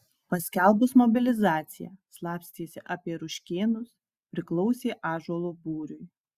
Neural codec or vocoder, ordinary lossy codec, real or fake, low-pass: none; Opus, 64 kbps; real; 14.4 kHz